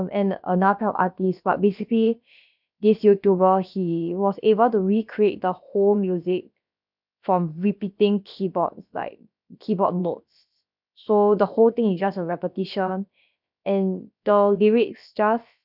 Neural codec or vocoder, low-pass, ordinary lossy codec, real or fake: codec, 16 kHz, about 1 kbps, DyCAST, with the encoder's durations; 5.4 kHz; none; fake